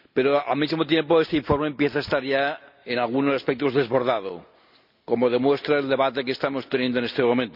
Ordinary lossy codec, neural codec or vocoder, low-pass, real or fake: none; none; 5.4 kHz; real